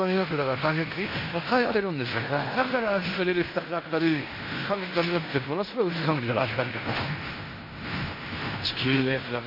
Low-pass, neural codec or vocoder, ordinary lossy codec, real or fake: 5.4 kHz; codec, 16 kHz in and 24 kHz out, 0.9 kbps, LongCat-Audio-Codec, fine tuned four codebook decoder; MP3, 32 kbps; fake